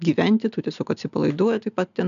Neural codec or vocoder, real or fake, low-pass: none; real; 7.2 kHz